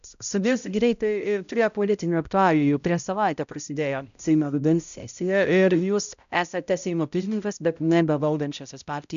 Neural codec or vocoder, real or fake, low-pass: codec, 16 kHz, 0.5 kbps, X-Codec, HuBERT features, trained on balanced general audio; fake; 7.2 kHz